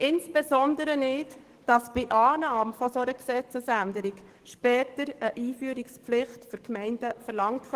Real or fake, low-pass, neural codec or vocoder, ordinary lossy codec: fake; 14.4 kHz; codec, 44.1 kHz, 7.8 kbps, DAC; Opus, 16 kbps